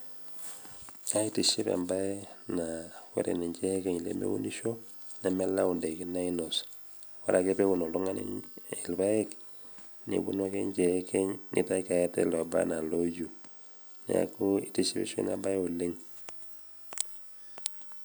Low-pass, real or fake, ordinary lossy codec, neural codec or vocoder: none; real; none; none